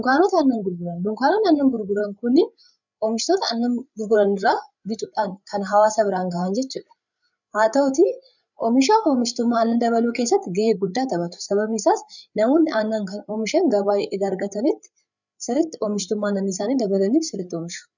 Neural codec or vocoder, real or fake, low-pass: codec, 16 kHz, 8 kbps, FreqCodec, larger model; fake; 7.2 kHz